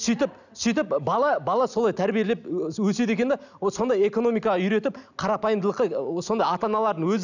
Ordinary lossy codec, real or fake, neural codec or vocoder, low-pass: none; real; none; 7.2 kHz